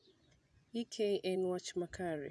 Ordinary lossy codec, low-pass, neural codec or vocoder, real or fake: none; none; vocoder, 24 kHz, 100 mel bands, Vocos; fake